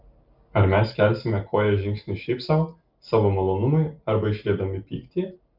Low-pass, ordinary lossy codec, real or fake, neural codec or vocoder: 5.4 kHz; Opus, 32 kbps; real; none